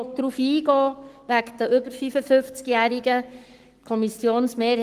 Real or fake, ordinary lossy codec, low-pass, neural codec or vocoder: fake; Opus, 24 kbps; 14.4 kHz; codec, 44.1 kHz, 7.8 kbps, Pupu-Codec